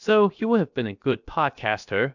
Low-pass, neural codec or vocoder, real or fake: 7.2 kHz; codec, 16 kHz, 0.7 kbps, FocalCodec; fake